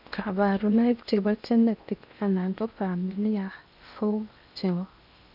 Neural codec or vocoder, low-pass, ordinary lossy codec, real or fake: codec, 16 kHz in and 24 kHz out, 0.8 kbps, FocalCodec, streaming, 65536 codes; 5.4 kHz; none; fake